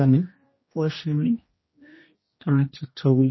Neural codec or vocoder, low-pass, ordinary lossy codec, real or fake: codec, 16 kHz, 1 kbps, X-Codec, HuBERT features, trained on general audio; 7.2 kHz; MP3, 24 kbps; fake